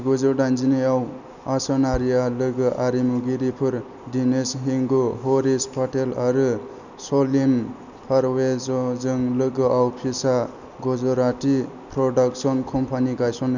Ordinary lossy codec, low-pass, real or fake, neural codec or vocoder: none; 7.2 kHz; real; none